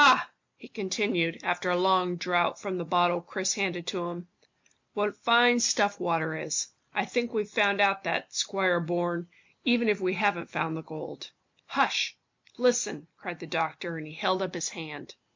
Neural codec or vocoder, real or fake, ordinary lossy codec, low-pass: none; real; MP3, 48 kbps; 7.2 kHz